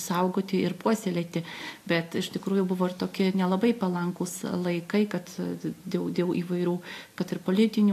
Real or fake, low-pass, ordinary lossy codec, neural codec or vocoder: real; 14.4 kHz; AAC, 64 kbps; none